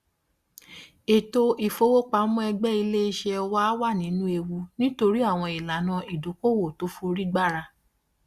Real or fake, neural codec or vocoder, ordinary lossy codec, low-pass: real; none; Opus, 64 kbps; 14.4 kHz